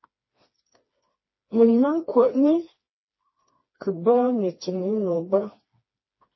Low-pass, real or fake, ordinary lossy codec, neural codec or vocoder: 7.2 kHz; fake; MP3, 24 kbps; codec, 16 kHz, 2 kbps, FreqCodec, smaller model